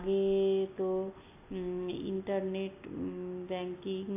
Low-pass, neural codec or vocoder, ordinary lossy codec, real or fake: 3.6 kHz; none; AAC, 24 kbps; real